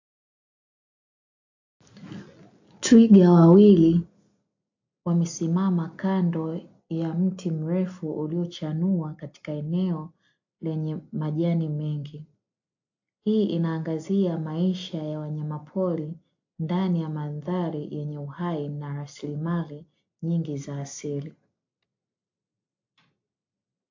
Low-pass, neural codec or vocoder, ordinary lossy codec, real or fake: 7.2 kHz; none; AAC, 48 kbps; real